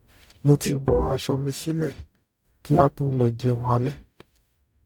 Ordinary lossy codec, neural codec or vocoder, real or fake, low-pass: none; codec, 44.1 kHz, 0.9 kbps, DAC; fake; 19.8 kHz